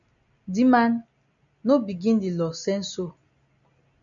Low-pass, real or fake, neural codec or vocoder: 7.2 kHz; real; none